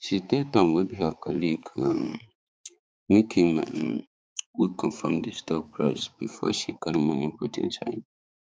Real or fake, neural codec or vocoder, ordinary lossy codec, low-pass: fake; codec, 16 kHz, 4 kbps, X-Codec, HuBERT features, trained on balanced general audio; none; none